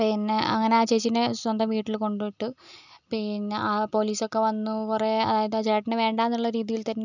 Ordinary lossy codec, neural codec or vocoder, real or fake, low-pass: none; none; real; 7.2 kHz